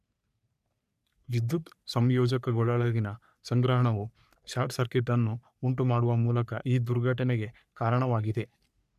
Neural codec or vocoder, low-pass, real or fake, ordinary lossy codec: codec, 44.1 kHz, 3.4 kbps, Pupu-Codec; 14.4 kHz; fake; none